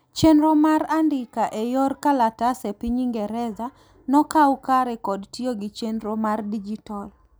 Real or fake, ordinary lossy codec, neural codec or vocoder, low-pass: real; none; none; none